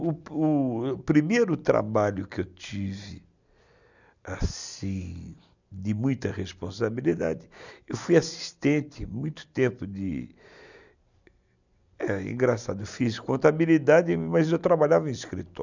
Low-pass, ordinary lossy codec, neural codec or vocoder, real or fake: 7.2 kHz; none; none; real